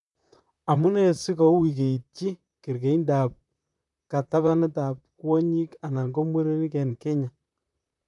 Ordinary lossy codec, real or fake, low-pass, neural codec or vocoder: none; fake; 10.8 kHz; vocoder, 44.1 kHz, 128 mel bands, Pupu-Vocoder